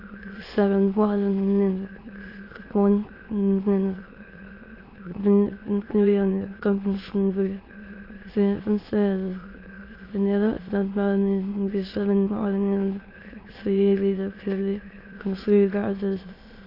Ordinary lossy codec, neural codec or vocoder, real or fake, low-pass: AAC, 24 kbps; autoencoder, 22.05 kHz, a latent of 192 numbers a frame, VITS, trained on many speakers; fake; 5.4 kHz